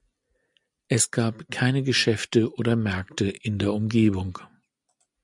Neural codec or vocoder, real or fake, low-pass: none; real; 10.8 kHz